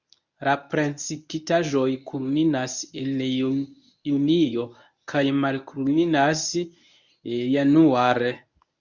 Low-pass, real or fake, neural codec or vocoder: 7.2 kHz; fake; codec, 24 kHz, 0.9 kbps, WavTokenizer, medium speech release version 1